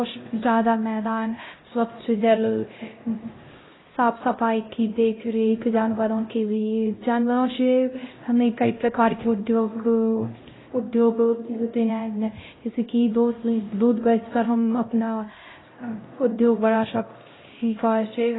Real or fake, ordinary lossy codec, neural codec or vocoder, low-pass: fake; AAC, 16 kbps; codec, 16 kHz, 0.5 kbps, X-Codec, HuBERT features, trained on LibriSpeech; 7.2 kHz